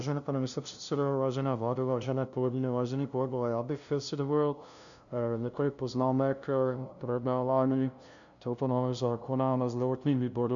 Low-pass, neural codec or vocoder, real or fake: 7.2 kHz; codec, 16 kHz, 0.5 kbps, FunCodec, trained on LibriTTS, 25 frames a second; fake